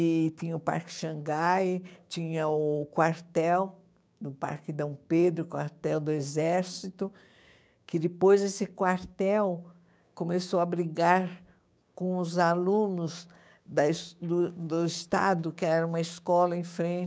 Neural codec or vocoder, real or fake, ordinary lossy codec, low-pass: codec, 16 kHz, 6 kbps, DAC; fake; none; none